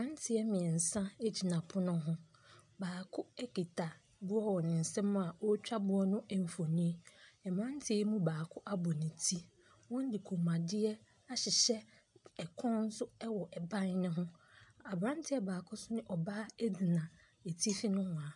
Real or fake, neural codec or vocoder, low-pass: real; none; 10.8 kHz